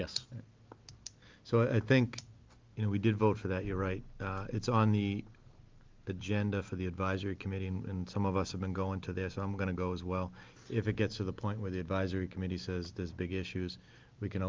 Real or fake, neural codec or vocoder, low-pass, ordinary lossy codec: real; none; 7.2 kHz; Opus, 32 kbps